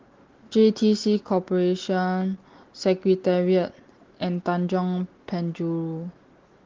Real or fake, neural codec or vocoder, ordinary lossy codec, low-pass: real; none; Opus, 16 kbps; 7.2 kHz